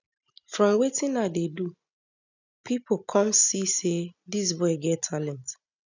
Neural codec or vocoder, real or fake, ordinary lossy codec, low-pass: none; real; none; 7.2 kHz